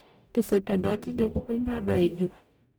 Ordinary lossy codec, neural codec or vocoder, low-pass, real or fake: none; codec, 44.1 kHz, 0.9 kbps, DAC; none; fake